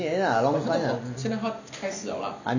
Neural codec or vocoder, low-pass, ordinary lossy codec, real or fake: none; 7.2 kHz; none; real